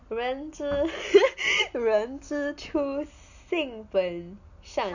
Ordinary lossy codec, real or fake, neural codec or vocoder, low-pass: none; real; none; 7.2 kHz